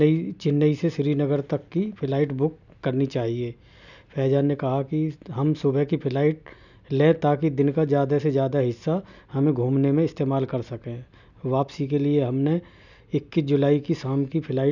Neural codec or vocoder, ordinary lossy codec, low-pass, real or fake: none; none; 7.2 kHz; real